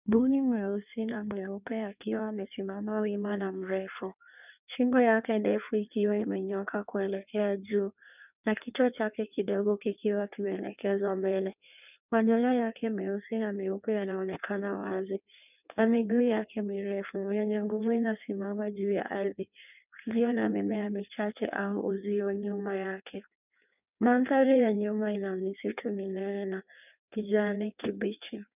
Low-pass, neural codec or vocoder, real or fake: 3.6 kHz; codec, 16 kHz in and 24 kHz out, 1.1 kbps, FireRedTTS-2 codec; fake